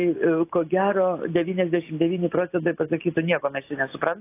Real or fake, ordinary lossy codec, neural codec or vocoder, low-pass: real; AAC, 24 kbps; none; 3.6 kHz